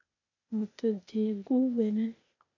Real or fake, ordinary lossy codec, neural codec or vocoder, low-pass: fake; AAC, 48 kbps; codec, 16 kHz, 0.8 kbps, ZipCodec; 7.2 kHz